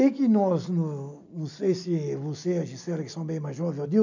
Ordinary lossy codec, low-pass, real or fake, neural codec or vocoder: none; 7.2 kHz; real; none